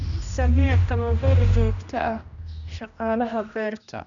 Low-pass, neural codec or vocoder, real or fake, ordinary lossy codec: 7.2 kHz; codec, 16 kHz, 1 kbps, X-Codec, HuBERT features, trained on general audio; fake; none